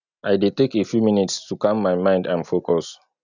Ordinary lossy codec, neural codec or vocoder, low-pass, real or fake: none; none; 7.2 kHz; real